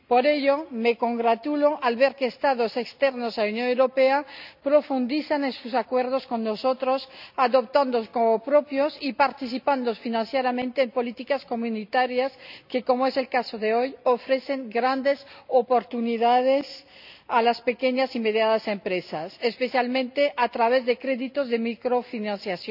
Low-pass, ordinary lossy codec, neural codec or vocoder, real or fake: 5.4 kHz; none; none; real